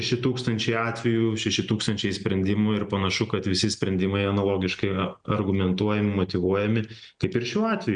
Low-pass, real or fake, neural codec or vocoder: 9.9 kHz; real; none